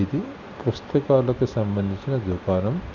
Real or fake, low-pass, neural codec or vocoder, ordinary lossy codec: real; 7.2 kHz; none; none